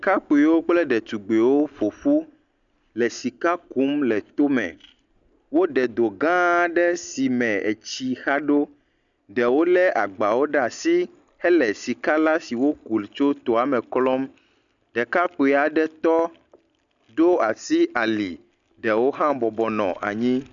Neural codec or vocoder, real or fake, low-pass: none; real; 7.2 kHz